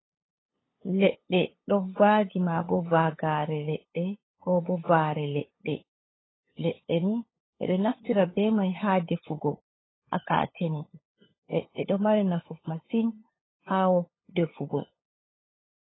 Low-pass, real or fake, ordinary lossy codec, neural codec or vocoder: 7.2 kHz; fake; AAC, 16 kbps; codec, 16 kHz, 8 kbps, FunCodec, trained on LibriTTS, 25 frames a second